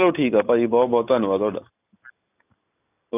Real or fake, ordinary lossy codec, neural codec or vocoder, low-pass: real; none; none; 3.6 kHz